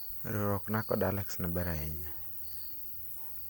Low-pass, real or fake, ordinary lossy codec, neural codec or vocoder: none; real; none; none